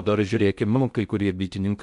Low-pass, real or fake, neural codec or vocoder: 10.8 kHz; fake; codec, 16 kHz in and 24 kHz out, 0.6 kbps, FocalCodec, streaming, 2048 codes